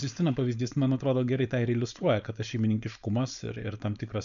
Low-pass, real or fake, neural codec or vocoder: 7.2 kHz; fake; codec, 16 kHz, 4.8 kbps, FACodec